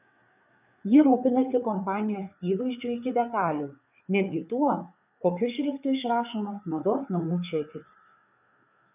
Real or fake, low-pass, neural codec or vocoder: fake; 3.6 kHz; codec, 16 kHz, 4 kbps, FreqCodec, larger model